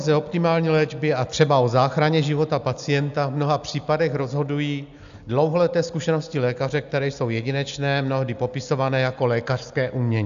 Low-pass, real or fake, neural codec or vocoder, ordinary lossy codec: 7.2 kHz; real; none; AAC, 96 kbps